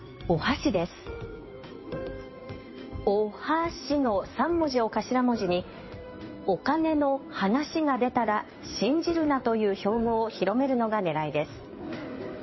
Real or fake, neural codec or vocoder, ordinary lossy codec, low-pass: fake; codec, 16 kHz in and 24 kHz out, 2.2 kbps, FireRedTTS-2 codec; MP3, 24 kbps; 7.2 kHz